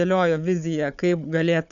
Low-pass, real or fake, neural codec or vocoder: 7.2 kHz; fake; codec, 16 kHz, 4 kbps, FunCodec, trained on Chinese and English, 50 frames a second